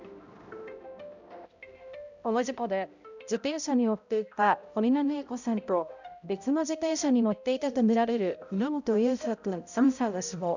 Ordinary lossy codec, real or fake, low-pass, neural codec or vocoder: none; fake; 7.2 kHz; codec, 16 kHz, 0.5 kbps, X-Codec, HuBERT features, trained on balanced general audio